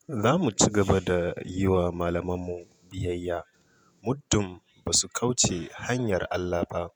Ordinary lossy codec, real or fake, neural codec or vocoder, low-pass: none; fake; vocoder, 48 kHz, 128 mel bands, Vocos; none